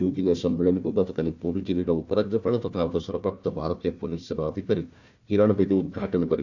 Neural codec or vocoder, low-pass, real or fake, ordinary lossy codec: codec, 16 kHz, 1 kbps, FunCodec, trained on Chinese and English, 50 frames a second; 7.2 kHz; fake; none